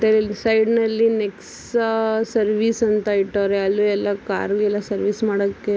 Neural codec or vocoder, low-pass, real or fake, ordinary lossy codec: none; none; real; none